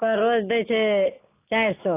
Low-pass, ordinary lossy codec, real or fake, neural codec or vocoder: 3.6 kHz; AAC, 24 kbps; real; none